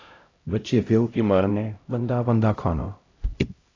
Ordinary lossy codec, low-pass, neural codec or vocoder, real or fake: AAC, 32 kbps; 7.2 kHz; codec, 16 kHz, 0.5 kbps, X-Codec, HuBERT features, trained on LibriSpeech; fake